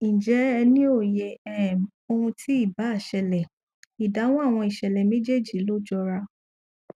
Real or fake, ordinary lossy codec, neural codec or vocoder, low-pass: real; none; none; 14.4 kHz